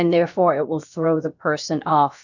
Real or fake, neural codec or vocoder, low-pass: fake; codec, 16 kHz, about 1 kbps, DyCAST, with the encoder's durations; 7.2 kHz